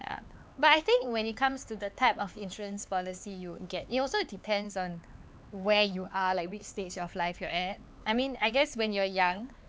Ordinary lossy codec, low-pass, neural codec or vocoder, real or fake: none; none; codec, 16 kHz, 4 kbps, X-Codec, HuBERT features, trained on LibriSpeech; fake